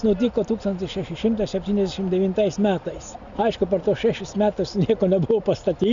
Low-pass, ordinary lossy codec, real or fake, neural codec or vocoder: 7.2 kHz; Opus, 64 kbps; real; none